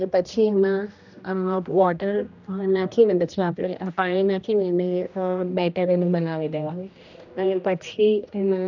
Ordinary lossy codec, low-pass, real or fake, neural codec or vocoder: none; 7.2 kHz; fake; codec, 16 kHz, 1 kbps, X-Codec, HuBERT features, trained on general audio